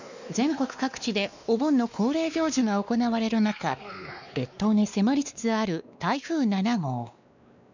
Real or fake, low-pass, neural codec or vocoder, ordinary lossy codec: fake; 7.2 kHz; codec, 16 kHz, 2 kbps, X-Codec, WavLM features, trained on Multilingual LibriSpeech; none